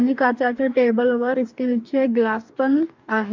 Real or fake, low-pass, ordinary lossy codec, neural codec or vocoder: fake; 7.2 kHz; MP3, 64 kbps; codec, 44.1 kHz, 2.6 kbps, DAC